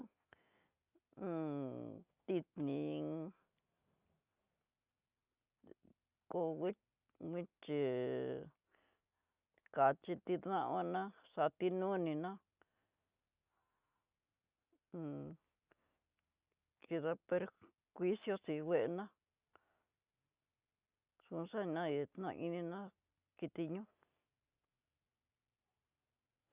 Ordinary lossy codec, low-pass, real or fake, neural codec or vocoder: Opus, 64 kbps; 3.6 kHz; real; none